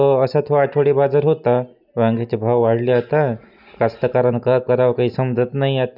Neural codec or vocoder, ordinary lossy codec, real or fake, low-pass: none; none; real; 5.4 kHz